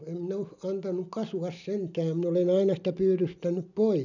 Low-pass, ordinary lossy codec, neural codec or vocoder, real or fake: 7.2 kHz; none; none; real